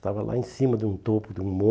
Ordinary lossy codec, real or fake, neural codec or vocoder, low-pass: none; real; none; none